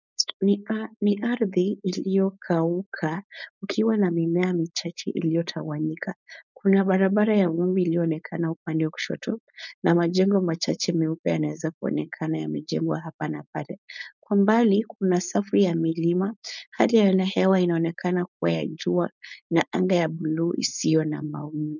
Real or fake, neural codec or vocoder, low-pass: fake; codec, 16 kHz, 4.8 kbps, FACodec; 7.2 kHz